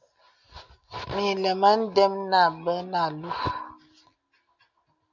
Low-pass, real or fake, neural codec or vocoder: 7.2 kHz; real; none